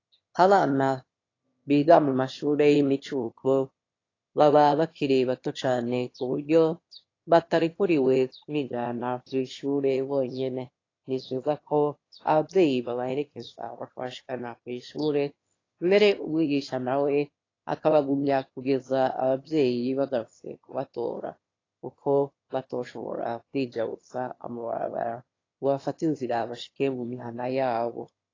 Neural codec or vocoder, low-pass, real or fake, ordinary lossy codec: autoencoder, 22.05 kHz, a latent of 192 numbers a frame, VITS, trained on one speaker; 7.2 kHz; fake; AAC, 32 kbps